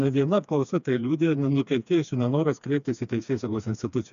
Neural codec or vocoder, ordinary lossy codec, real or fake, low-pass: codec, 16 kHz, 2 kbps, FreqCodec, smaller model; AAC, 96 kbps; fake; 7.2 kHz